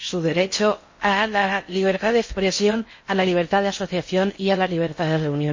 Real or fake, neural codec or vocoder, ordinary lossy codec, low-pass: fake; codec, 16 kHz in and 24 kHz out, 0.6 kbps, FocalCodec, streaming, 4096 codes; MP3, 32 kbps; 7.2 kHz